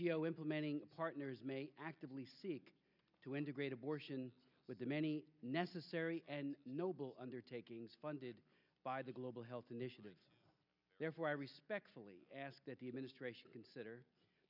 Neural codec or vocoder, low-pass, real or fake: none; 5.4 kHz; real